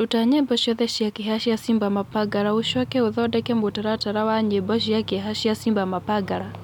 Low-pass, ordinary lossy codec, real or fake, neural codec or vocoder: 19.8 kHz; none; real; none